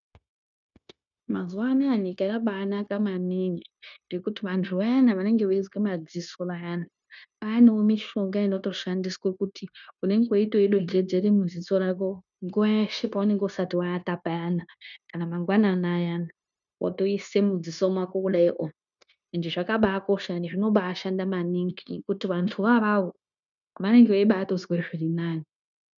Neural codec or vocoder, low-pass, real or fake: codec, 16 kHz, 0.9 kbps, LongCat-Audio-Codec; 7.2 kHz; fake